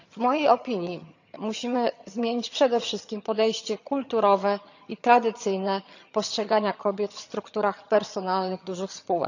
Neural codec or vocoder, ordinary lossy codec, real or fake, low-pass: vocoder, 22.05 kHz, 80 mel bands, HiFi-GAN; none; fake; 7.2 kHz